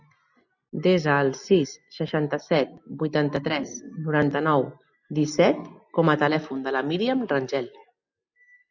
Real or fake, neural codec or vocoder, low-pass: real; none; 7.2 kHz